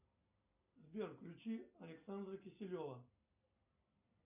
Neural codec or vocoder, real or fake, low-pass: none; real; 3.6 kHz